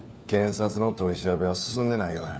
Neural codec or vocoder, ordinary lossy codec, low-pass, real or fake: codec, 16 kHz, 4 kbps, FunCodec, trained on LibriTTS, 50 frames a second; none; none; fake